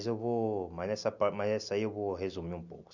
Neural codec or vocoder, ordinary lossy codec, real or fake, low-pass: none; none; real; 7.2 kHz